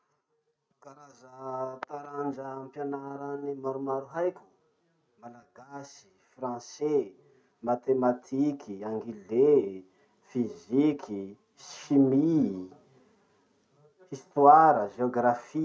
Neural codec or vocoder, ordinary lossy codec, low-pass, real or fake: none; none; none; real